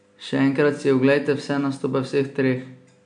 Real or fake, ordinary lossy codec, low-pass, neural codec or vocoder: real; MP3, 64 kbps; 9.9 kHz; none